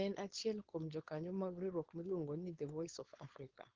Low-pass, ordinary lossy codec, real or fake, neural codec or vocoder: 7.2 kHz; Opus, 16 kbps; fake; codec, 16 kHz, 4.8 kbps, FACodec